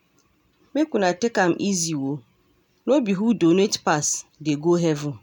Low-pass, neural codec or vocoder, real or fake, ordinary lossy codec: 19.8 kHz; none; real; none